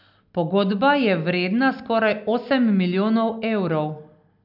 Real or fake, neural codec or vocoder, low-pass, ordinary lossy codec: real; none; 5.4 kHz; none